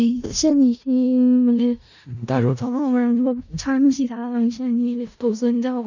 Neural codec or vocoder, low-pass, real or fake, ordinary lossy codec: codec, 16 kHz in and 24 kHz out, 0.4 kbps, LongCat-Audio-Codec, four codebook decoder; 7.2 kHz; fake; none